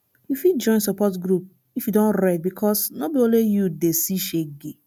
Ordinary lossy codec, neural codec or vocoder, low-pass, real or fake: none; none; none; real